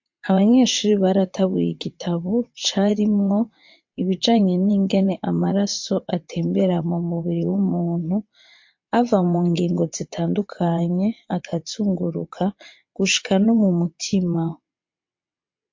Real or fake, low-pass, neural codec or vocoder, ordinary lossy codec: fake; 7.2 kHz; vocoder, 22.05 kHz, 80 mel bands, WaveNeXt; MP3, 48 kbps